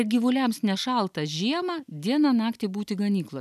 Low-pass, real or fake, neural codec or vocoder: 14.4 kHz; real; none